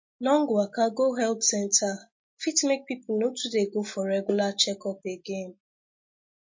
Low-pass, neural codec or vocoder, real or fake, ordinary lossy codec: 7.2 kHz; none; real; MP3, 32 kbps